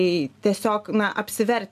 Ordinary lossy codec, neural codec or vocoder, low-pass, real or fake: AAC, 96 kbps; none; 14.4 kHz; real